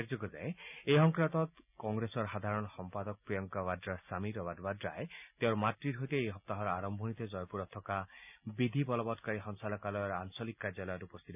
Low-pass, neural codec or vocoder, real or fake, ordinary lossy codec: 3.6 kHz; none; real; none